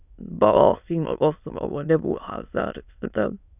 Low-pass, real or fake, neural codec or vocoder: 3.6 kHz; fake; autoencoder, 22.05 kHz, a latent of 192 numbers a frame, VITS, trained on many speakers